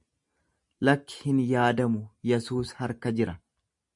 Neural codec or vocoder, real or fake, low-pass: none; real; 10.8 kHz